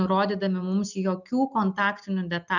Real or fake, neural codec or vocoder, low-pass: real; none; 7.2 kHz